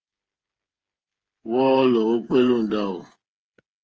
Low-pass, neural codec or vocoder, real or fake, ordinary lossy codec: 7.2 kHz; codec, 16 kHz, 8 kbps, FreqCodec, smaller model; fake; Opus, 24 kbps